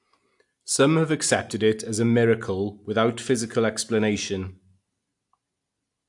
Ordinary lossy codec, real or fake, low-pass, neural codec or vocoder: AAC, 64 kbps; real; 10.8 kHz; none